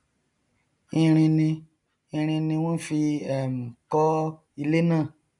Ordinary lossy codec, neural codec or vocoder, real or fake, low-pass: none; none; real; 10.8 kHz